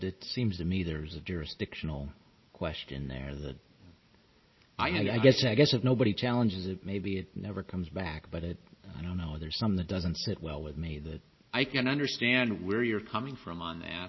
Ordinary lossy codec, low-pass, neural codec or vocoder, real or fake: MP3, 24 kbps; 7.2 kHz; none; real